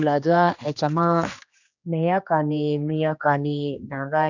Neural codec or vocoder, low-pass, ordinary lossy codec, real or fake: codec, 16 kHz, 2 kbps, X-Codec, HuBERT features, trained on general audio; 7.2 kHz; none; fake